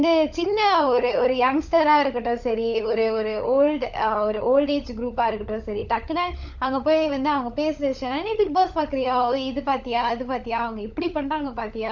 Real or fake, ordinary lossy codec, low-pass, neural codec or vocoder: fake; none; 7.2 kHz; codec, 16 kHz, 16 kbps, FunCodec, trained on LibriTTS, 50 frames a second